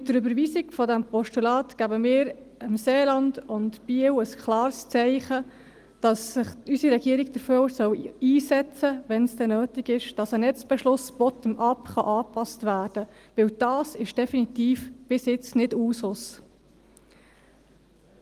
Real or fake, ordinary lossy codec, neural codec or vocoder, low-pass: real; Opus, 24 kbps; none; 14.4 kHz